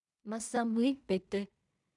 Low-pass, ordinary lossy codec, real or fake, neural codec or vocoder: 10.8 kHz; MP3, 96 kbps; fake; codec, 16 kHz in and 24 kHz out, 0.4 kbps, LongCat-Audio-Codec, two codebook decoder